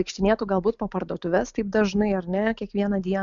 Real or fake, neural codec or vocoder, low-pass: real; none; 7.2 kHz